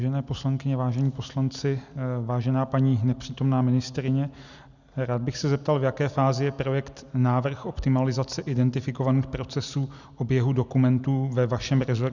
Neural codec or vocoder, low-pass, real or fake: none; 7.2 kHz; real